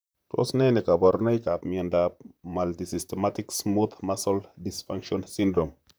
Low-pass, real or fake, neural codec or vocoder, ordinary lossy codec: none; fake; vocoder, 44.1 kHz, 128 mel bands, Pupu-Vocoder; none